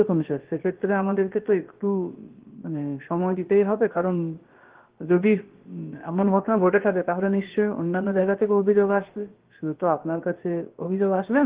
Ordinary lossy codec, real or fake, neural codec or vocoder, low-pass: Opus, 16 kbps; fake; codec, 16 kHz, about 1 kbps, DyCAST, with the encoder's durations; 3.6 kHz